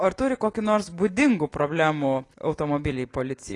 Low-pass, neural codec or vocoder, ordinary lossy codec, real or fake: 10.8 kHz; none; AAC, 32 kbps; real